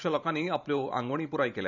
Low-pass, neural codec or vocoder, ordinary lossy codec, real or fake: 7.2 kHz; vocoder, 44.1 kHz, 128 mel bands every 512 samples, BigVGAN v2; none; fake